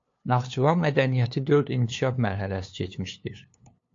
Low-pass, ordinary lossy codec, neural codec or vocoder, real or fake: 7.2 kHz; AAC, 48 kbps; codec, 16 kHz, 2 kbps, FunCodec, trained on LibriTTS, 25 frames a second; fake